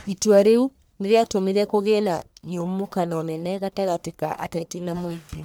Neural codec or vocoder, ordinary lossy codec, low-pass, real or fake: codec, 44.1 kHz, 1.7 kbps, Pupu-Codec; none; none; fake